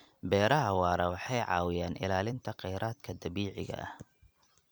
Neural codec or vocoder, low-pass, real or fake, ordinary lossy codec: vocoder, 44.1 kHz, 128 mel bands every 256 samples, BigVGAN v2; none; fake; none